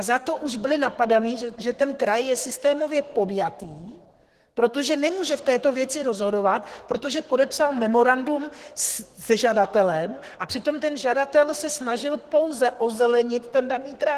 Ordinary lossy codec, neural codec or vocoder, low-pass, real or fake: Opus, 16 kbps; codec, 32 kHz, 1.9 kbps, SNAC; 14.4 kHz; fake